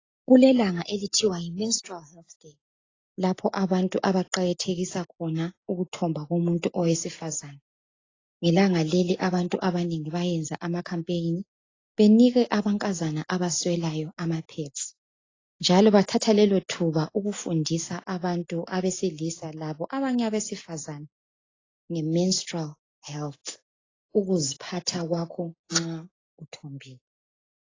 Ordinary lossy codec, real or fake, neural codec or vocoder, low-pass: AAC, 32 kbps; real; none; 7.2 kHz